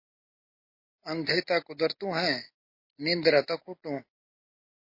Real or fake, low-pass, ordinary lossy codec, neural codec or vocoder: real; 5.4 kHz; MP3, 32 kbps; none